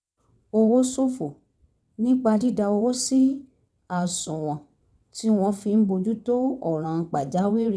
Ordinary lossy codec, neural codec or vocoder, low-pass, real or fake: none; vocoder, 22.05 kHz, 80 mel bands, WaveNeXt; none; fake